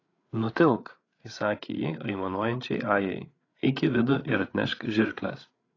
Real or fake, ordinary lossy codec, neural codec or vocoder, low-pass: fake; AAC, 32 kbps; codec, 16 kHz, 8 kbps, FreqCodec, larger model; 7.2 kHz